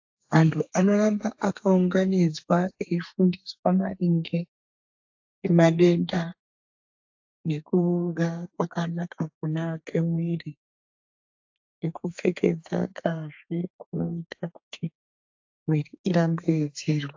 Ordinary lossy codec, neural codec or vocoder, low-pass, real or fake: AAC, 48 kbps; codec, 32 kHz, 1.9 kbps, SNAC; 7.2 kHz; fake